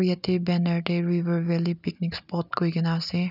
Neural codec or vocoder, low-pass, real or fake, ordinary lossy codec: none; 5.4 kHz; real; none